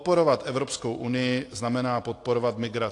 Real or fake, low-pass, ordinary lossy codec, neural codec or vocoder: real; 10.8 kHz; AAC, 48 kbps; none